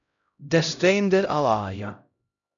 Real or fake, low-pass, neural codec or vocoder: fake; 7.2 kHz; codec, 16 kHz, 0.5 kbps, X-Codec, HuBERT features, trained on LibriSpeech